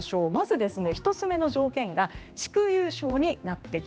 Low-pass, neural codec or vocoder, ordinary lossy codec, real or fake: none; codec, 16 kHz, 2 kbps, X-Codec, HuBERT features, trained on general audio; none; fake